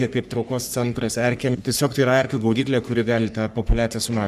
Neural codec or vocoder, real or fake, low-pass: codec, 44.1 kHz, 3.4 kbps, Pupu-Codec; fake; 14.4 kHz